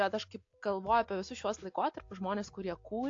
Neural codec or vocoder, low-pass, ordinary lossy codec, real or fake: none; 7.2 kHz; AAC, 48 kbps; real